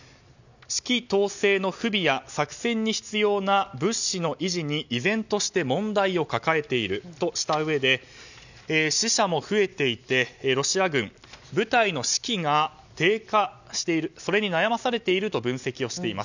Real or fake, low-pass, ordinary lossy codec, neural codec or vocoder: real; 7.2 kHz; none; none